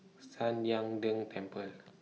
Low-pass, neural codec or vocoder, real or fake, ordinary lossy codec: none; none; real; none